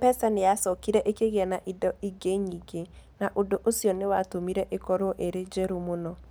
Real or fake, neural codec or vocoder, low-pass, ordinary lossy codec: real; none; none; none